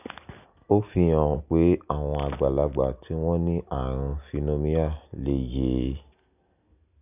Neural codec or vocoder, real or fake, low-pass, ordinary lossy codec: none; real; 3.6 kHz; none